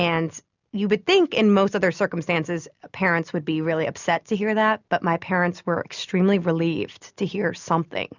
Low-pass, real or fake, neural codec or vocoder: 7.2 kHz; real; none